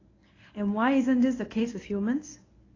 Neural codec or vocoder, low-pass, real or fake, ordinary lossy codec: codec, 24 kHz, 0.9 kbps, WavTokenizer, medium speech release version 1; 7.2 kHz; fake; AAC, 32 kbps